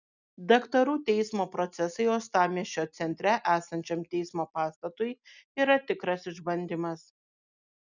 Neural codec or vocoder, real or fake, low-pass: none; real; 7.2 kHz